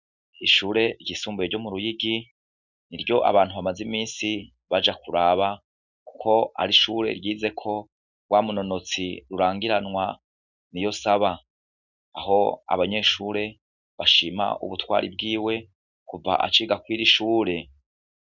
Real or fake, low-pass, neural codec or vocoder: real; 7.2 kHz; none